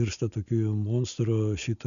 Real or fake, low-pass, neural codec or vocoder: real; 7.2 kHz; none